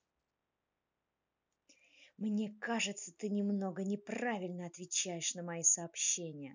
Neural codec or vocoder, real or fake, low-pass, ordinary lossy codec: none; real; 7.2 kHz; none